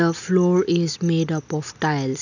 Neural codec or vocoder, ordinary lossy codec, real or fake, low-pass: none; none; real; 7.2 kHz